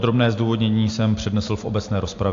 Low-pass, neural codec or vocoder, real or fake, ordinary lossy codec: 7.2 kHz; none; real; AAC, 48 kbps